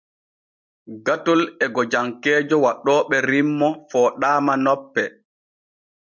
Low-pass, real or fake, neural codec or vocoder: 7.2 kHz; real; none